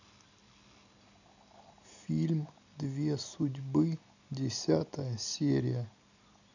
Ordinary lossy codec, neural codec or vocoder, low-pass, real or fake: none; none; 7.2 kHz; real